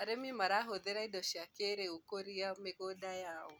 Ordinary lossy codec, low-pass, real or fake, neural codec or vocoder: none; none; real; none